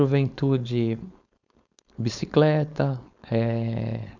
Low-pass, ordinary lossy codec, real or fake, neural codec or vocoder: 7.2 kHz; none; fake; codec, 16 kHz, 4.8 kbps, FACodec